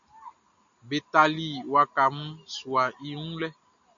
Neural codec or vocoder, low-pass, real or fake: none; 7.2 kHz; real